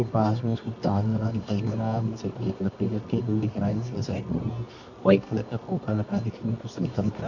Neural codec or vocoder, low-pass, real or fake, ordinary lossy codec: codec, 24 kHz, 0.9 kbps, WavTokenizer, medium music audio release; 7.2 kHz; fake; none